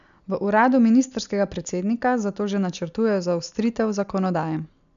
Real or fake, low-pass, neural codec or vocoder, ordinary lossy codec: real; 7.2 kHz; none; none